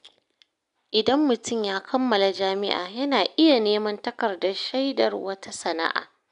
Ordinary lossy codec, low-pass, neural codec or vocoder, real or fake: none; 10.8 kHz; none; real